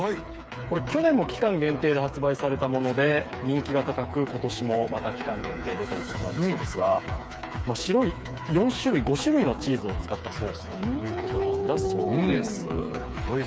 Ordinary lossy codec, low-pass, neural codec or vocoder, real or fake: none; none; codec, 16 kHz, 4 kbps, FreqCodec, smaller model; fake